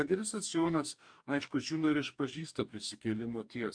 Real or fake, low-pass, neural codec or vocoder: fake; 9.9 kHz; codec, 44.1 kHz, 2.6 kbps, DAC